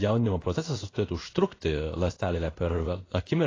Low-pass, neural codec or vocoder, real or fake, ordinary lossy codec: 7.2 kHz; codec, 16 kHz in and 24 kHz out, 1 kbps, XY-Tokenizer; fake; AAC, 32 kbps